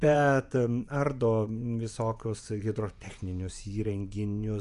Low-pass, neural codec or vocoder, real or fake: 10.8 kHz; vocoder, 24 kHz, 100 mel bands, Vocos; fake